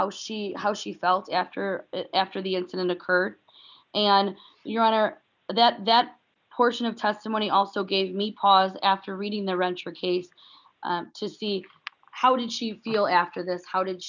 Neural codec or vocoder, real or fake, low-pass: none; real; 7.2 kHz